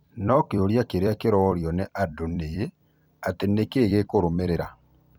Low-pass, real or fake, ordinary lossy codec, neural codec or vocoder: 19.8 kHz; fake; none; vocoder, 44.1 kHz, 128 mel bands every 256 samples, BigVGAN v2